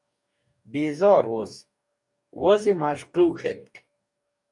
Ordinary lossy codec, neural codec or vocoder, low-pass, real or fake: MP3, 96 kbps; codec, 44.1 kHz, 2.6 kbps, DAC; 10.8 kHz; fake